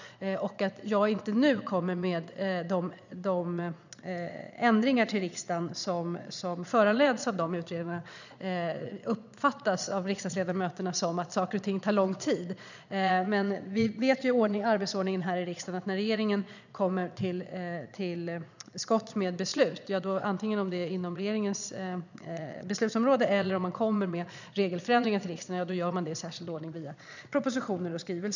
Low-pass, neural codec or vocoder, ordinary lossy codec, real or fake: 7.2 kHz; vocoder, 22.05 kHz, 80 mel bands, Vocos; none; fake